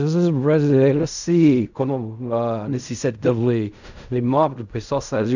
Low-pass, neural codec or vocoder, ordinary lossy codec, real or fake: 7.2 kHz; codec, 16 kHz in and 24 kHz out, 0.4 kbps, LongCat-Audio-Codec, fine tuned four codebook decoder; none; fake